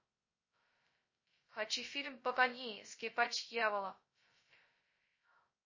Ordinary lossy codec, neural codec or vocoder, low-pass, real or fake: MP3, 32 kbps; codec, 16 kHz, 0.2 kbps, FocalCodec; 7.2 kHz; fake